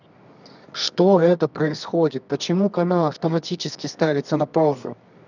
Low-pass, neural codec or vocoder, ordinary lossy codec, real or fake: 7.2 kHz; codec, 24 kHz, 0.9 kbps, WavTokenizer, medium music audio release; none; fake